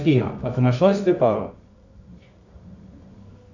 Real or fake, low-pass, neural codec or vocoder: fake; 7.2 kHz; codec, 24 kHz, 0.9 kbps, WavTokenizer, medium music audio release